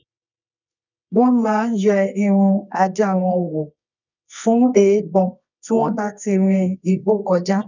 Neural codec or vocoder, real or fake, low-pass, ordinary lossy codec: codec, 24 kHz, 0.9 kbps, WavTokenizer, medium music audio release; fake; 7.2 kHz; none